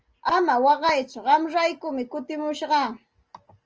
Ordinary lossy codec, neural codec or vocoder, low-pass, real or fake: Opus, 24 kbps; none; 7.2 kHz; real